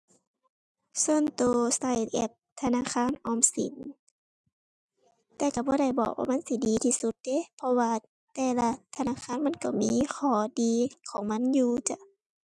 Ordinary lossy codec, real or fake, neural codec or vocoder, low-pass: none; real; none; none